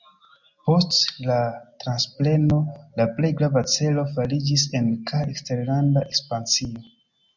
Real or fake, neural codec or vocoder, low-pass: real; none; 7.2 kHz